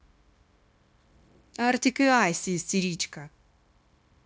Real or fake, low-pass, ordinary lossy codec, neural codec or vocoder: fake; none; none; codec, 16 kHz, 0.9 kbps, LongCat-Audio-Codec